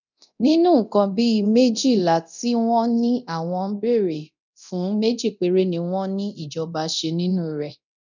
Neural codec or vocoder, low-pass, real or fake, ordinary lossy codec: codec, 24 kHz, 0.9 kbps, DualCodec; 7.2 kHz; fake; none